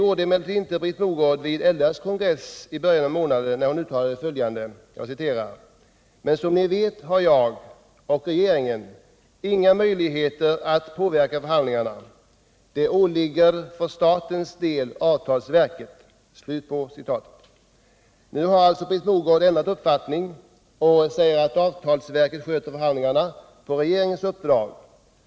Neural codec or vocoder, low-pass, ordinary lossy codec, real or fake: none; none; none; real